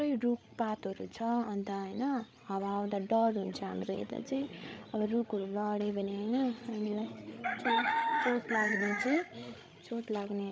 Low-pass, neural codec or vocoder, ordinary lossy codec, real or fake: none; codec, 16 kHz, 16 kbps, FreqCodec, larger model; none; fake